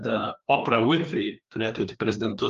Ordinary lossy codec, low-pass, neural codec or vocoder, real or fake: Opus, 32 kbps; 7.2 kHz; codec, 16 kHz, 2 kbps, FreqCodec, larger model; fake